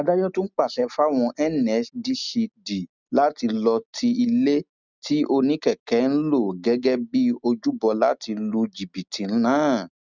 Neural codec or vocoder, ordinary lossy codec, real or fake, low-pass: none; none; real; 7.2 kHz